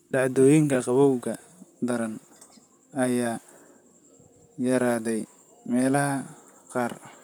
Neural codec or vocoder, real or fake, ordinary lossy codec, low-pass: vocoder, 44.1 kHz, 128 mel bands, Pupu-Vocoder; fake; none; none